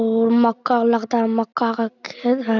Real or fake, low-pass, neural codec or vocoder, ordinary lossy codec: real; 7.2 kHz; none; none